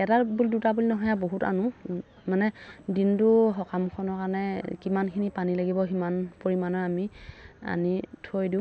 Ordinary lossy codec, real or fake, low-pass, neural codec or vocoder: none; real; none; none